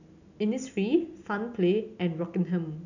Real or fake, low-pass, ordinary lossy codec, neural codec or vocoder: real; 7.2 kHz; none; none